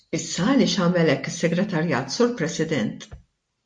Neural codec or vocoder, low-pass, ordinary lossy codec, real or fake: none; 9.9 kHz; MP3, 48 kbps; real